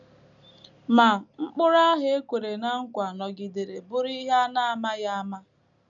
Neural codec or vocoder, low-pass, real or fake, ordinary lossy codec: none; 7.2 kHz; real; none